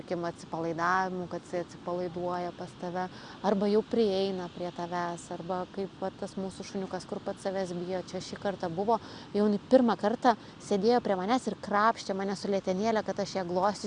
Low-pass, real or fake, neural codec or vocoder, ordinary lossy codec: 9.9 kHz; real; none; Opus, 64 kbps